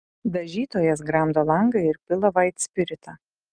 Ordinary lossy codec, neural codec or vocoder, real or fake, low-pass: Opus, 32 kbps; none; real; 9.9 kHz